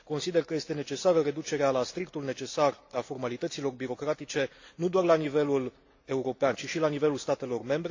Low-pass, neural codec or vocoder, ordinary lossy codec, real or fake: 7.2 kHz; none; AAC, 48 kbps; real